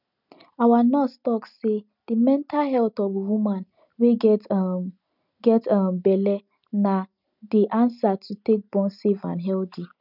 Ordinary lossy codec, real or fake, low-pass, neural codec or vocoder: none; real; 5.4 kHz; none